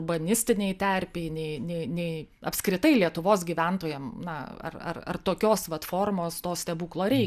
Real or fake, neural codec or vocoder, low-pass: real; none; 14.4 kHz